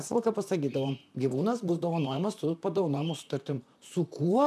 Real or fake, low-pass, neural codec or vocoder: fake; 14.4 kHz; vocoder, 44.1 kHz, 128 mel bands, Pupu-Vocoder